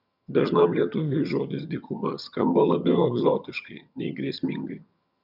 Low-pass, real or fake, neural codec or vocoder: 5.4 kHz; fake; vocoder, 22.05 kHz, 80 mel bands, HiFi-GAN